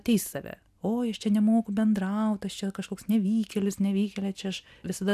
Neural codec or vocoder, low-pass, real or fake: none; 14.4 kHz; real